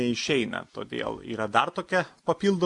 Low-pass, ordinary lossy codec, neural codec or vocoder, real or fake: 10.8 kHz; AAC, 64 kbps; none; real